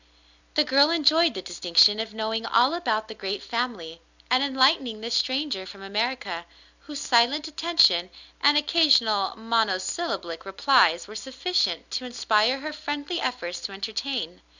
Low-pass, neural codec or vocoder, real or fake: 7.2 kHz; none; real